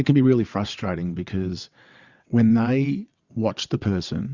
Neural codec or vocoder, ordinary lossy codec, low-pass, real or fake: vocoder, 22.05 kHz, 80 mel bands, WaveNeXt; Opus, 64 kbps; 7.2 kHz; fake